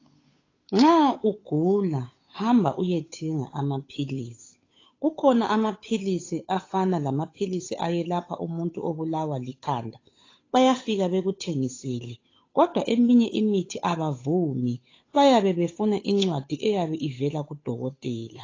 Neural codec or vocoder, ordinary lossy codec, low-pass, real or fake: codec, 16 kHz, 8 kbps, FunCodec, trained on Chinese and English, 25 frames a second; AAC, 32 kbps; 7.2 kHz; fake